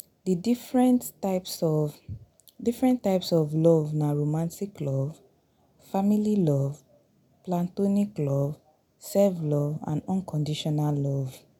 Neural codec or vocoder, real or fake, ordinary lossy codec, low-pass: none; real; none; 19.8 kHz